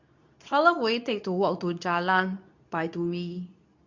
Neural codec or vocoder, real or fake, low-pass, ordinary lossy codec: codec, 24 kHz, 0.9 kbps, WavTokenizer, medium speech release version 2; fake; 7.2 kHz; none